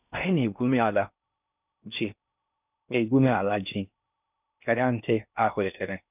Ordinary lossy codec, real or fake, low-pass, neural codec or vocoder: none; fake; 3.6 kHz; codec, 16 kHz in and 24 kHz out, 0.6 kbps, FocalCodec, streaming, 4096 codes